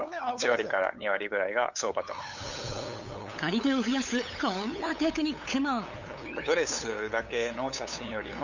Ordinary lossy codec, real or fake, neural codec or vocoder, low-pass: Opus, 64 kbps; fake; codec, 16 kHz, 8 kbps, FunCodec, trained on LibriTTS, 25 frames a second; 7.2 kHz